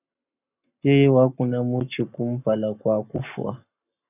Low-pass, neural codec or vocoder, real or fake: 3.6 kHz; codec, 44.1 kHz, 7.8 kbps, Pupu-Codec; fake